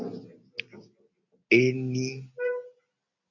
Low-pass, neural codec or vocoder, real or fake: 7.2 kHz; none; real